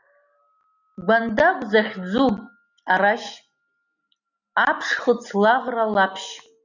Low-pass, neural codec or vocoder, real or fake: 7.2 kHz; none; real